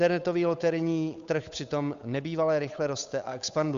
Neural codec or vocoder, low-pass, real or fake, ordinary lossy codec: codec, 16 kHz, 8 kbps, FunCodec, trained on Chinese and English, 25 frames a second; 7.2 kHz; fake; Opus, 64 kbps